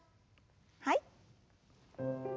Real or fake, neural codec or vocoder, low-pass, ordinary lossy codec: real; none; none; none